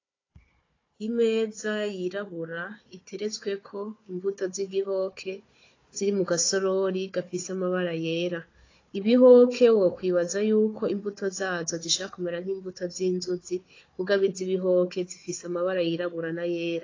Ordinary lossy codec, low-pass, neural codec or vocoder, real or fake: AAC, 32 kbps; 7.2 kHz; codec, 16 kHz, 4 kbps, FunCodec, trained on Chinese and English, 50 frames a second; fake